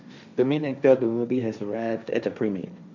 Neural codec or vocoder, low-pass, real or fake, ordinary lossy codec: codec, 16 kHz, 1.1 kbps, Voila-Tokenizer; 7.2 kHz; fake; none